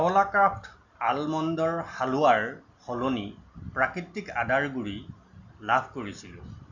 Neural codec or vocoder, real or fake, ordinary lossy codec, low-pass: none; real; none; 7.2 kHz